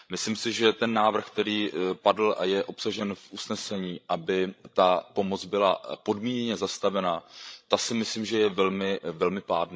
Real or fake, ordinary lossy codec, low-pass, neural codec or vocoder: fake; none; none; codec, 16 kHz, 16 kbps, FreqCodec, larger model